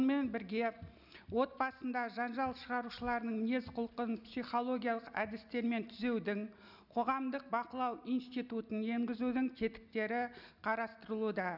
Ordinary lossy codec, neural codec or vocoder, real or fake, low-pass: none; none; real; 5.4 kHz